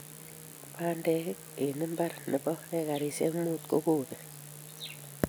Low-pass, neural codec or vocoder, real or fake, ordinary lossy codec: none; none; real; none